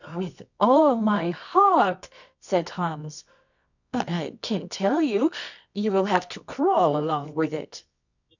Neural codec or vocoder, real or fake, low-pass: codec, 24 kHz, 0.9 kbps, WavTokenizer, medium music audio release; fake; 7.2 kHz